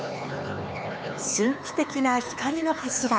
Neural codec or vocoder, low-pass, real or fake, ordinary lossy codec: codec, 16 kHz, 4 kbps, X-Codec, HuBERT features, trained on LibriSpeech; none; fake; none